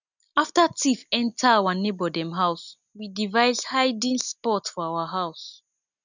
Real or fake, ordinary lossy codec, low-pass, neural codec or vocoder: real; none; 7.2 kHz; none